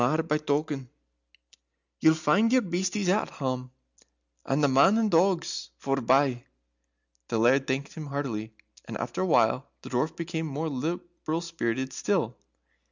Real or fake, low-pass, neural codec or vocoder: real; 7.2 kHz; none